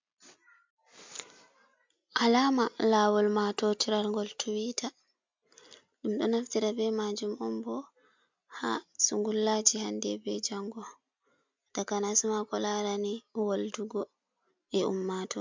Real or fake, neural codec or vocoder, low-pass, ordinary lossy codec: real; none; 7.2 kHz; MP3, 64 kbps